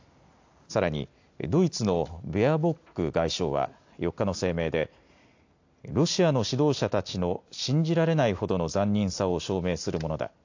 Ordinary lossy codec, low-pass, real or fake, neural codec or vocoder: AAC, 48 kbps; 7.2 kHz; real; none